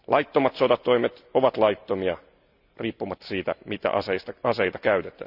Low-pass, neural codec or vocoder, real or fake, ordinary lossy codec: 5.4 kHz; none; real; none